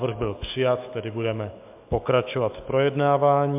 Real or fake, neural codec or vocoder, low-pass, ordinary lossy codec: fake; autoencoder, 48 kHz, 128 numbers a frame, DAC-VAE, trained on Japanese speech; 3.6 kHz; MP3, 32 kbps